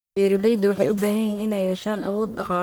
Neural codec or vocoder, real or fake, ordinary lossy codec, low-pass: codec, 44.1 kHz, 1.7 kbps, Pupu-Codec; fake; none; none